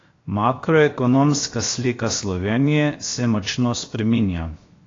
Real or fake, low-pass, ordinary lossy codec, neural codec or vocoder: fake; 7.2 kHz; AAC, 32 kbps; codec, 16 kHz, 0.7 kbps, FocalCodec